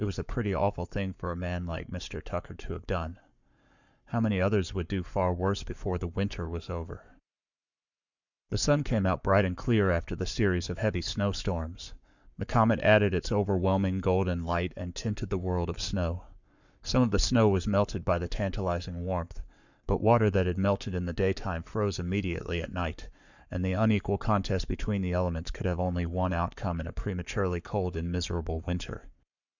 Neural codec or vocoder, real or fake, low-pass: codec, 44.1 kHz, 7.8 kbps, Pupu-Codec; fake; 7.2 kHz